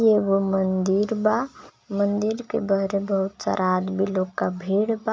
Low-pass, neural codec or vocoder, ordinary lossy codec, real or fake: none; none; none; real